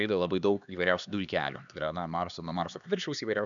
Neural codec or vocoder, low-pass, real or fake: codec, 16 kHz, 2 kbps, X-Codec, HuBERT features, trained on LibriSpeech; 7.2 kHz; fake